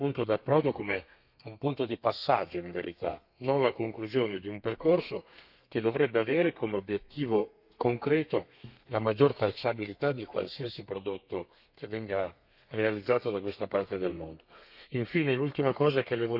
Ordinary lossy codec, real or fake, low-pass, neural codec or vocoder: none; fake; 5.4 kHz; codec, 32 kHz, 1.9 kbps, SNAC